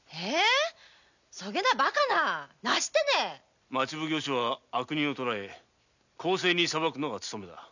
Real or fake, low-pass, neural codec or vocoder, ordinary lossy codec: real; 7.2 kHz; none; none